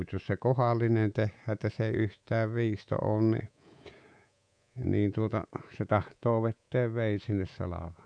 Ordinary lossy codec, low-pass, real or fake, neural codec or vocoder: none; 9.9 kHz; fake; codec, 24 kHz, 3.1 kbps, DualCodec